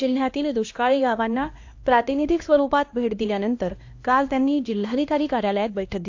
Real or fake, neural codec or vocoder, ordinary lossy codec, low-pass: fake; codec, 16 kHz, 1 kbps, X-Codec, HuBERT features, trained on LibriSpeech; AAC, 48 kbps; 7.2 kHz